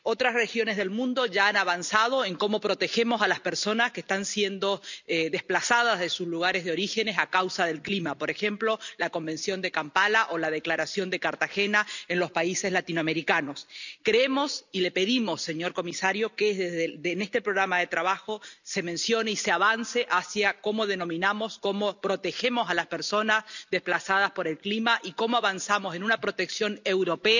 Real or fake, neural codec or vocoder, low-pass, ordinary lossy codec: real; none; 7.2 kHz; none